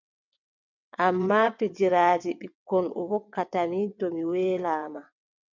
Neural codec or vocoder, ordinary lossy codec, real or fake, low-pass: vocoder, 22.05 kHz, 80 mel bands, WaveNeXt; MP3, 64 kbps; fake; 7.2 kHz